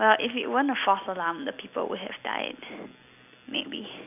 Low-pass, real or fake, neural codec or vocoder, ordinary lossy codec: 3.6 kHz; real; none; none